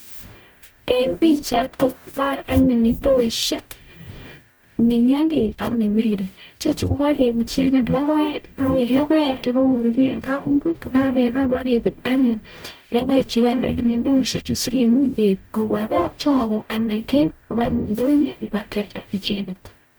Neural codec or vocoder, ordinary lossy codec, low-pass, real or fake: codec, 44.1 kHz, 0.9 kbps, DAC; none; none; fake